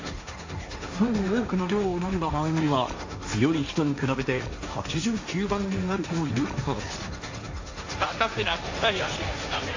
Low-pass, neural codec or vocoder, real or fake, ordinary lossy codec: 7.2 kHz; codec, 16 kHz, 1.1 kbps, Voila-Tokenizer; fake; AAC, 48 kbps